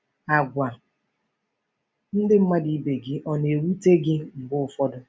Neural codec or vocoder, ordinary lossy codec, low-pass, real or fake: none; none; none; real